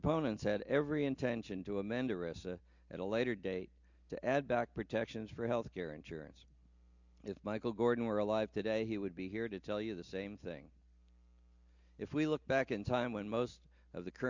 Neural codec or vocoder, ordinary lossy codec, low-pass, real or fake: none; Opus, 64 kbps; 7.2 kHz; real